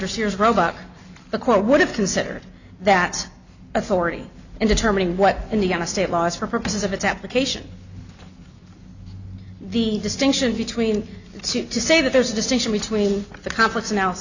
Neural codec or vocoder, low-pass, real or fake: none; 7.2 kHz; real